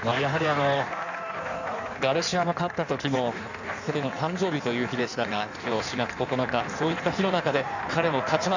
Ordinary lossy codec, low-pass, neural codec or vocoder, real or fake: none; 7.2 kHz; codec, 16 kHz in and 24 kHz out, 1.1 kbps, FireRedTTS-2 codec; fake